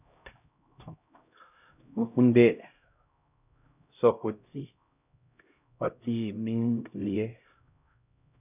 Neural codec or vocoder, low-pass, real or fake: codec, 16 kHz, 0.5 kbps, X-Codec, HuBERT features, trained on LibriSpeech; 3.6 kHz; fake